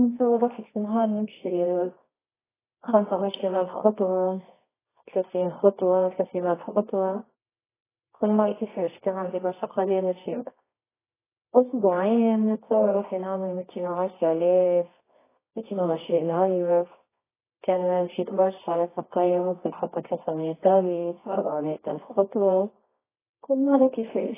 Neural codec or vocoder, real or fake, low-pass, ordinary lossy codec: codec, 24 kHz, 0.9 kbps, WavTokenizer, medium music audio release; fake; 3.6 kHz; AAC, 16 kbps